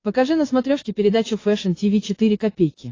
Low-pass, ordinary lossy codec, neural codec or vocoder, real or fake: 7.2 kHz; AAC, 32 kbps; vocoder, 22.05 kHz, 80 mel bands, Vocos; fake